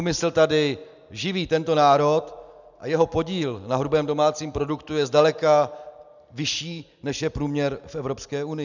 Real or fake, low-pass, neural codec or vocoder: real; 7.2 kHz; none